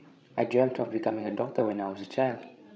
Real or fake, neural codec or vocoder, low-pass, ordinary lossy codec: fake; codec, 16 kHz, 16 kbps, FreqCodec, larger model; none; none